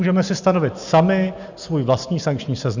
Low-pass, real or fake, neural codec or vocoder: 7.2 kHz; real; none